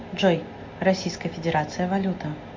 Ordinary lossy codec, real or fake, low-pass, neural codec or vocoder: MP3, 48 kbps; real; 7.2 kHz; none